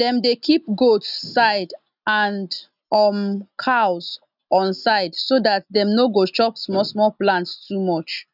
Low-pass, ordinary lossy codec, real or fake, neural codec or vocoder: 5.4 kHz; none; real; none